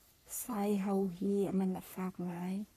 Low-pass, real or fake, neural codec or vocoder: 14.4 kHz; fake; codec, 44.1 kHz, 3.4 kbps, Pupu-Codec